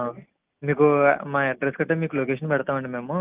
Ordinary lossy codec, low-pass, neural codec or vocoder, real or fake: Opus, 32 kbps; 3.6 kHz; none; real